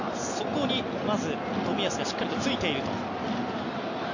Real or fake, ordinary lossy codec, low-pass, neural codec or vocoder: real; none; 7.2 kHz; none